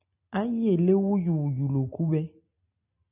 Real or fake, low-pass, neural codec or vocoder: real; 3.6 kHz; none